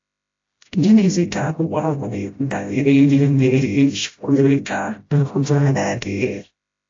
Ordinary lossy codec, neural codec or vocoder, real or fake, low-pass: AAC, 48 kbps; codec, 16 kHz, 0.5 kbps, FreqCodec, smaller model; fake; 7.2 kHz